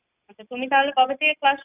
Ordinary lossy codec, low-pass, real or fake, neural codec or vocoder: none; 3.6 kHz; real; none